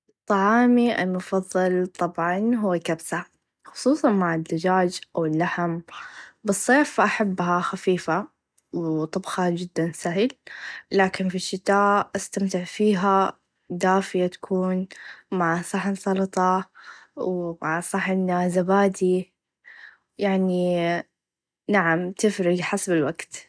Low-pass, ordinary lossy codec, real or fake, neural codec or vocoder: none; none; real; none